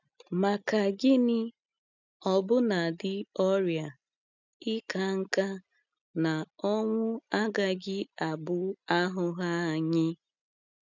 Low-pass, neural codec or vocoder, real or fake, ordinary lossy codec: 7.2 kHz; none; real; none